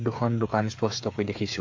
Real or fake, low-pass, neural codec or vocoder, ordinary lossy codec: fake; 7.2 kHz; codec, 16 kHz, 8 kbps, FreqCodec, smaller model; AAC, 48 kbps